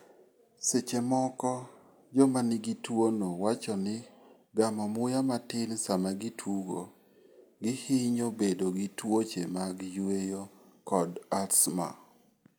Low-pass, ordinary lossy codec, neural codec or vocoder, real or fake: none; none; none; real